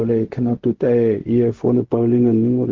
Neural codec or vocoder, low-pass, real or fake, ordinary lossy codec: codec, 16 kHz, 0.4 kbps, LongCat-Audio-Codec; 7.2 kHz; fake; Opus, 16 kbps